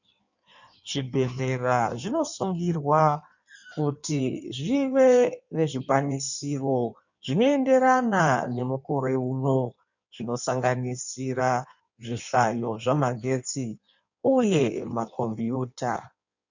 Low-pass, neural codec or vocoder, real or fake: 7.2 kHz; codec, 16 kHz in and 24 kHz out, 1.1 kbps, FireRedTTS-2 codec; fake